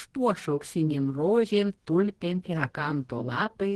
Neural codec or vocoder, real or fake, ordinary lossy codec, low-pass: codec, 24 kHz, 0.9 kbps, WavTokenizer, medium music audio release; fake; Opus, 16 kbps; 10.8 kHz